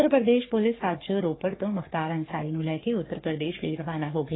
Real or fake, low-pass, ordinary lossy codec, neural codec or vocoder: fake; 7.2 kHz; AAC, 16 kbps; codec, 44.1 kHz, 3.4 kbps, Pupu-Codec